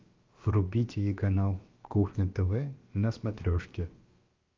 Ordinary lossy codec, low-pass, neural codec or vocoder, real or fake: Opus, 32 kbps; 7.2 kHz; codec, 16 kHz, about 1 kbps, DyCAST, with the encoder's durations; fake